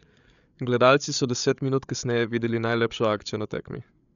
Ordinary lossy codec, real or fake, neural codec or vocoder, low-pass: none; fake; codec, 16 kHz, 8 kbps, FreqCodec, larger model; 7.2 kHz